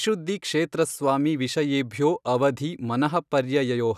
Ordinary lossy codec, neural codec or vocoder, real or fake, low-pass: none; none; real; 14.4 kHz